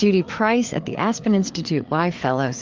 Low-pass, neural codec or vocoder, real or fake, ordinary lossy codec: 7.2 kHz; codec, 16 kHz, 4 kbps, FreqCodec, larger model; fake; Opus, 24 kbps